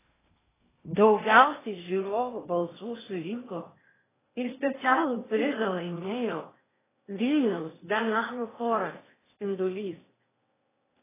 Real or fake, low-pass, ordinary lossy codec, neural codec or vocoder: fake; 3.6 kHz; AAC, 16 kbps; codec, 16 kHz in and 24 kHz out, 0.8 kbps, FocalCodec, streaming, 65536 codes